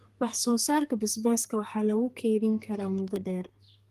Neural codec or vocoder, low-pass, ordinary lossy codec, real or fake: codec, 32 kHz, 1.9 kbps, SNAC; 14.4 kHz; Opus, 24 kbps; fake